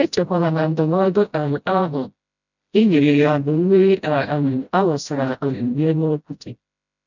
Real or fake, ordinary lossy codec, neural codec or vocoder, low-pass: fake; none; codec, 16 kHz, 0.5 kbps, FreqCodec, smaller model; 7.2 kHz